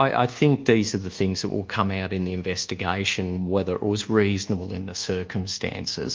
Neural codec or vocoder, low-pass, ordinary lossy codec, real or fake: codec, 24 kHz, 1.2 kbps, DualCodec; 7.2 kHz; Opus, 32 kbps; fake